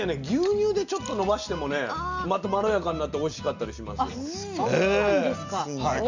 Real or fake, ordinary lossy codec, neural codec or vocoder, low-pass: real; Opus, 64 kbps; none; 7.2 kHz